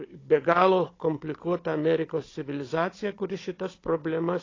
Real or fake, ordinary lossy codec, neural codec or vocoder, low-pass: fake; AAC, 32 kbps; codec, 16 kHz, 16 kbps, FunCodec, trained on Chinese and English, 50 frames a second; 7.2 kHz